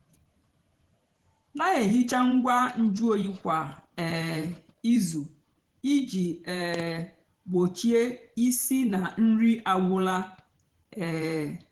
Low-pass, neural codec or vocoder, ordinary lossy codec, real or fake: 19.8 kHz; vocoder, 44.1 kHz, 128 mel bands every 512 samples, BigVGAN v2; Opus, 16 kbps; fake